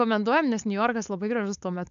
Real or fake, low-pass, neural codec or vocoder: fake; 7.2 kHz; codec, 16 kHz, 4.8 kbps, FACodec